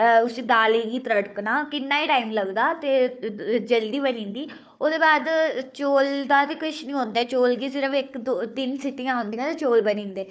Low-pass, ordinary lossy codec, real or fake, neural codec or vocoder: none; none; fake; codec, 16 kHz, 4 kbps, FunCodec, trained on Chinese and English, 50 frames a second